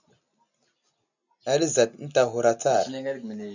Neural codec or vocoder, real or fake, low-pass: none; real; 7.2 kHz